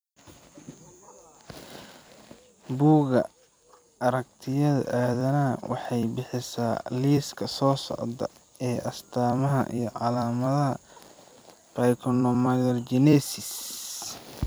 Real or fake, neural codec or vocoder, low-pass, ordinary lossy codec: fake; vocoder, 44.1 kHz, 128 mel bands every 256 samples, BigVGAN v2; none; none